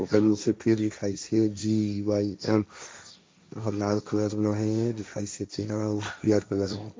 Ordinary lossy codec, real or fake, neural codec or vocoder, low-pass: none; fake; codec, 16 kHz, 1.1 kbps, Voila-Tokenizer; none